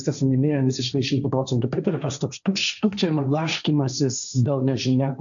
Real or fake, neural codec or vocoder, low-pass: fake; codec, 16 kHz, 1.1 kbps, Voila-Tokenizer; 7.2 kHz